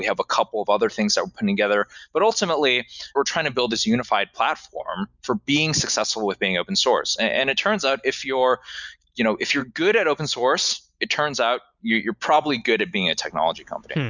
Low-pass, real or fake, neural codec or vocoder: 7.2 kHz; real; none